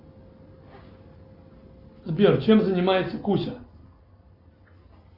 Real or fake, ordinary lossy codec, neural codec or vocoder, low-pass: real; Opus, 64 kbps; none; 5.4 kHz